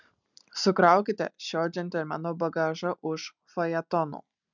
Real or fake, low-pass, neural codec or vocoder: real; 7.2 kHz; none